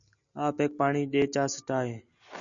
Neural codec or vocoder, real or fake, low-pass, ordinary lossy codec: none; real; 7.2 kHz; MP3, 96 kbps